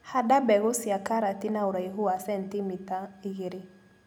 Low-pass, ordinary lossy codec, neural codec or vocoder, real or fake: none; none; none; real